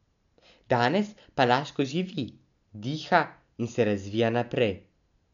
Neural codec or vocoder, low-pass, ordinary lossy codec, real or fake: none; 7.2 kHz; none; real